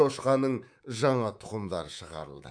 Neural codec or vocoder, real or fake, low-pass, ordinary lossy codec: codec, 24 kHz, 3.1 kbps, DualCodec; fake; 9.9 kHz; none